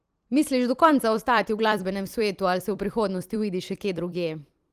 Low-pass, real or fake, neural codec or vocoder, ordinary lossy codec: 14.4 kHz; fake; vocoder, 44.1 kHz, 128 mel bands every 256 samples, BigVGAN v2; Opus, 24 kbps